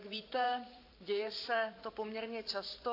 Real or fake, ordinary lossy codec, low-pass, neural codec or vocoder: fake; AAC, 32 kbps; 5.4 kHz; vocoder, 44.1 kHz, 128 mel bands every 512 samples, BigVGAN v2